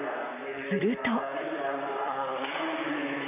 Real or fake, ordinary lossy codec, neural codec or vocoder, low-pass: fake; none; vocoder, 44.1 kHz, 128 mel bands, Pupu-Vocoder; 3.6 kHz